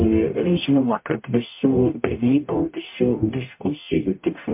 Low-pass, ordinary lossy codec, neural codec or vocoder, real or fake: 3.6 kHz; MP3, 32 kbps; codec, 44.1 kHz, 0.9 kbps, DAC; fake